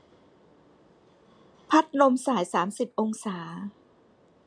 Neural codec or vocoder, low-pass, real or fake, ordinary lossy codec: none; 9.9 kHz; real; MP3, 64 kbps